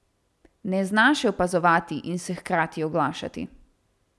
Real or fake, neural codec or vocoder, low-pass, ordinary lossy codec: real; none; none; none